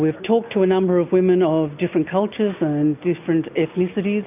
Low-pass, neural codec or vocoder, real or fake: 3.6 kHz; none; real